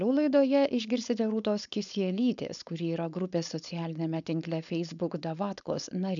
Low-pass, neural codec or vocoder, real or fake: 7.2 kHz; codec, 16 kHz, 4.8 kbps, FACodec; fake